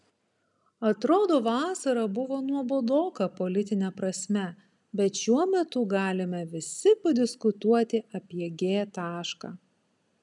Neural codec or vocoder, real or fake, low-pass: none; real; 10.8 kHz